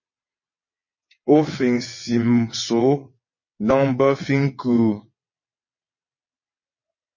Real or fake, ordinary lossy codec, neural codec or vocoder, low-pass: fake; MP3, 32 kbps; vocoder, 22.05 kHz, 80 mel bands, WaveNeXt; 7.2 kHz